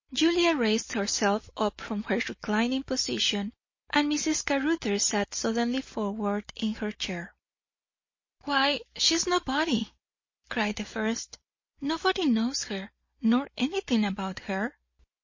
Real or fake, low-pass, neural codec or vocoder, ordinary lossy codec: real; 7.2 kHz; none; MP3, 32 kbps